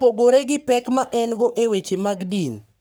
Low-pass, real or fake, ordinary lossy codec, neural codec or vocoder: none; fake; none; codec, 44.1 kHz, 3.4 kbps, Pupu-Codec